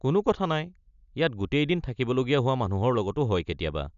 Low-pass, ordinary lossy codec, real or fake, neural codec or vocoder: 7.2 kHz; none; real; none